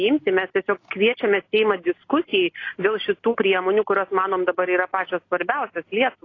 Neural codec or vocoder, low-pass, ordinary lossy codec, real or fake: none; 7.2 kHz; AAC, 32 kbps; real